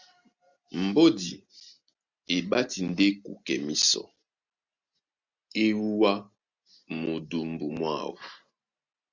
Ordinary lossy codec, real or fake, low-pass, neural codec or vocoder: Opus, 64 kbps; real; 7.2 kHz; none